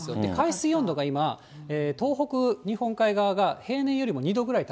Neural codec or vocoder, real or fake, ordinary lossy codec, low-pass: none; real; none; none